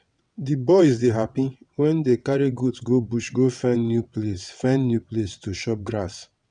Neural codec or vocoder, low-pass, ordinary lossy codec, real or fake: vocoder, 22.05 kHz, 80 mel bands, WaveNeXt; 9.9 kHz; none; fake